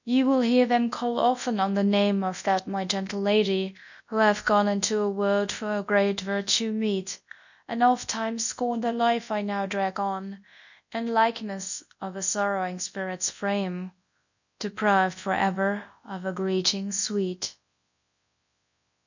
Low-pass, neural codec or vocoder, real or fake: 7.2 kHz; codec, 24 kHz, 0.9 kbps, WavTokenizer, large speech release; fake